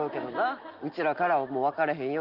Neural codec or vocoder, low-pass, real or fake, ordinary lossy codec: none; 5.4 kHz; real; Opus, 24 kbps